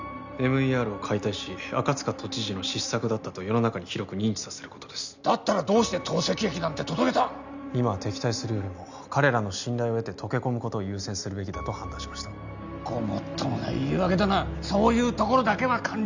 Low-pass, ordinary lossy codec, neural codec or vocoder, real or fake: 7.2 kHz; none; none; real